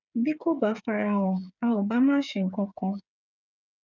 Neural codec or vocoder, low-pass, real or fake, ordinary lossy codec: codec, 16 kHz, 8 kbps, FreqCodec, smaller model; 7.2 kHz; fake; none